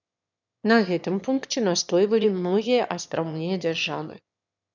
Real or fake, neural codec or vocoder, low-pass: fake; autoencoder, 22.05 kHz, a latent of 192 numbers a frame, VITS, trained on one speaker; 7.2 kHz